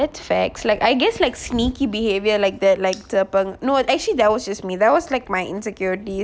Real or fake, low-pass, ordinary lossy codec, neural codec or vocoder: real; none; none; none